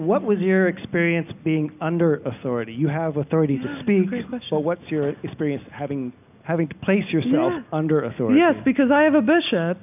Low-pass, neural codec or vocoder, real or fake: 3.6 kHz; none; real